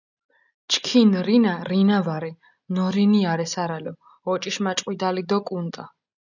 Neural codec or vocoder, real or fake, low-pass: none; real; 7.2 kHz